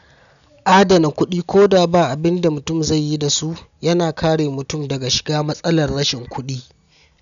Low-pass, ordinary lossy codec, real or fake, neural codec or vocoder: 7.2 kHz; none; real; none